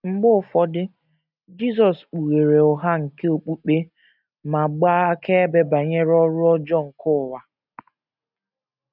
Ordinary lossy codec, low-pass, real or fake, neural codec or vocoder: none; 5.4 kHz; real; none